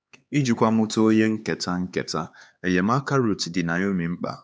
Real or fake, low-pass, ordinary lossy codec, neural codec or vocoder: fake; none; none; codec, 16 kHz, 4 kbps, X-Codec, HuBERT features, trained on LibriSpeech